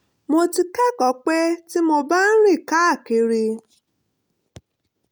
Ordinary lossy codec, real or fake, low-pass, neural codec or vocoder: none; real; none; none